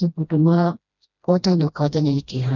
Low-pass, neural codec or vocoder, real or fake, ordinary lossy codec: 7.2 kHz; codec, 16 kHz, 1 kbps, FreqCodec, smaller model; fake; none